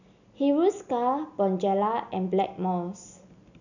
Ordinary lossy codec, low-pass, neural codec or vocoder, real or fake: none; 7.2 kHz; none; real